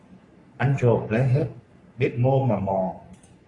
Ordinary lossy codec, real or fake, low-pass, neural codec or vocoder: AAC, 48 kbps; fake; 10.8 kHz; codec, 44.1 kHz, 3.4 kbps, Pupu-Codec